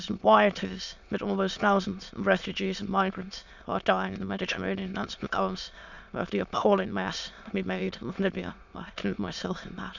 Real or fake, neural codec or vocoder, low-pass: fake; autoencoder, 22.05 kHz, a latent of 192 numbers a frame, VITS, trained on many speakers; 7.2 kHz